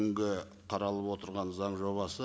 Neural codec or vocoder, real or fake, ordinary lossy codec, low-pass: none; real; none; none